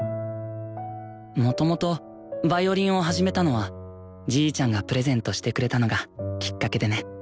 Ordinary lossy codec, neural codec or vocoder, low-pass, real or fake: none; none; none; real